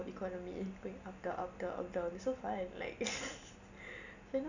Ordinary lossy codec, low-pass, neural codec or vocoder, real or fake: none; 7.2 kHz; none; real